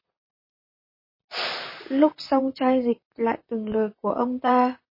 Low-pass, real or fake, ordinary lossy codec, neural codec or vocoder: 5.4 kHz; fake; MP3, 24 kbps; vocoder, 44.1 kHz, 128 mel bands, Pupu-Vocoder